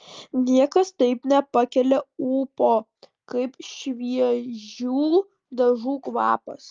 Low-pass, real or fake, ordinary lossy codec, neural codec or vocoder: 7.2 kHz; real; Opus, 32 kbps; none